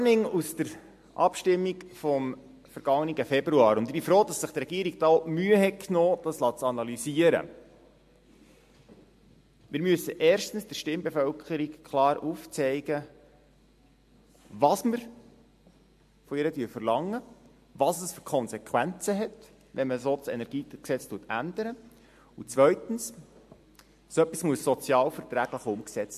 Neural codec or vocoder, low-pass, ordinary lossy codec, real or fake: none; 14.4 kHz; MP3, 64 kbps; real